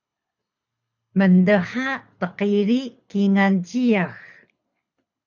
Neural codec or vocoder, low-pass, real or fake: codec, 24 kHz, 6 kbps, HILCodec; 7.2 kHz; fake